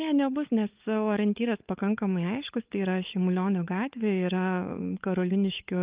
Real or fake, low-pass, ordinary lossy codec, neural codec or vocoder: fake; 3.6 kHz; Opus, 32 kbps; codec, 16 kHz, 16 kbps, FunCodec, trained on LibriTTS, 50 frames a second